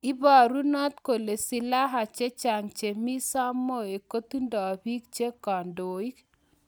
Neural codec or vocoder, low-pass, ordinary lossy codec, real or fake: none; none; none; real